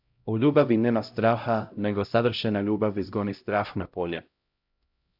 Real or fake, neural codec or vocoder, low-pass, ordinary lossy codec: fake; codec, 16 kHz, 0.5 kbps, X-Codec, HuBERT features, trained on LibriSpeech; 5.4 kHz; AAC, 48 kbps